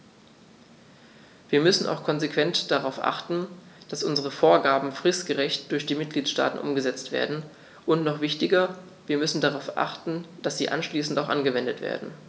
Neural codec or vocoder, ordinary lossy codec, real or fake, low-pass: none; none; real; none